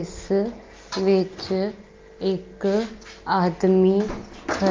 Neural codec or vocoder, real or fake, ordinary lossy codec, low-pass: none; real; Opus, 32 kbps; 7.2 kHz